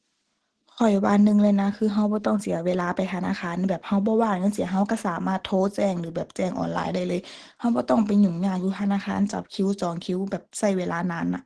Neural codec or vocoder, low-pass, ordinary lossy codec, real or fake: none; 10.8 kHz; Opus, 16 kbps; real